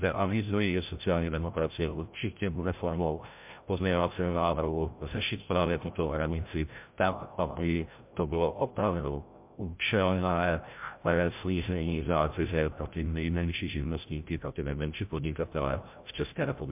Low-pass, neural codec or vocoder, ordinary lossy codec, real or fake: 3.6 kHz; codec, 16 kHz, 0.5 kbps, FreqCodec, larger model; MP3, 32 kbps; fake